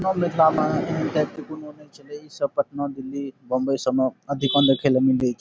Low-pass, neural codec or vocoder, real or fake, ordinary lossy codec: none; none; real; none